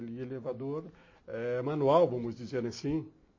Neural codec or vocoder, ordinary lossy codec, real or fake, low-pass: none; MP3, 32 kbps; real; 7.2 kHz